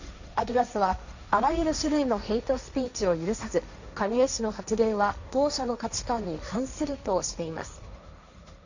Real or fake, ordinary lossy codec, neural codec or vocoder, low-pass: fake; none; codec, 16 kHz, 1.1 kbps, Voila-Tokenizer; 7.2 kHz